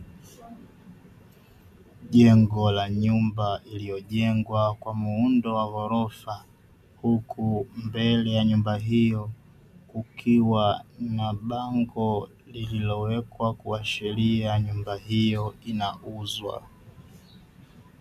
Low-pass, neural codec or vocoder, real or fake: 14.4 kHz; none; real